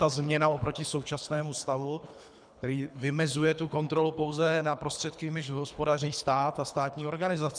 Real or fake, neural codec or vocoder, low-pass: fake; codec, 24 kHz, 3 kbps, HILCodec; 9.9 kHz